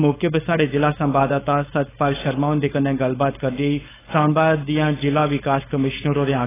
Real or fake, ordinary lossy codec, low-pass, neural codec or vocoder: fake; AAC, 16 kbps; 3.6 kHz; codec, 16 kHz, 4.8 kbps, FACodec